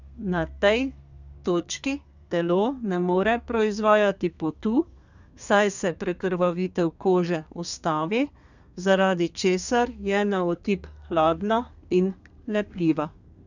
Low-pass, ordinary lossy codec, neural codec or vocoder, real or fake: 7.2 kHz; none; codec, 32 kHz, 1.9 kbps, SNAC; fake